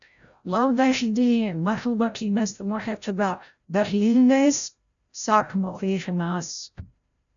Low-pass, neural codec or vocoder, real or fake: 7.2 kHz; codec, 16 kHz, 0.5 kbps, FreqCodec, larger model; fake